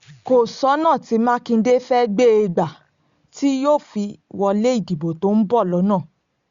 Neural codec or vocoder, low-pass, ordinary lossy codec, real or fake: none; 7.2 kHz; Opus, 64 kbps; real